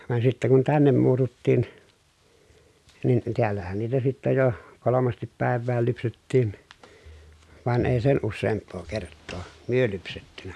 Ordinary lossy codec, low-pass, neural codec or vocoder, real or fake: none; none; none; real